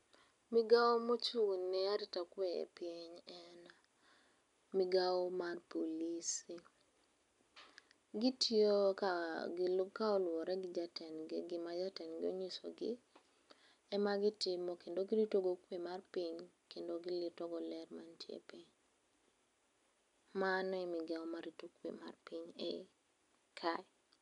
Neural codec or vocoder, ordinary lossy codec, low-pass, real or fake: none; none; 10.8 kHz; real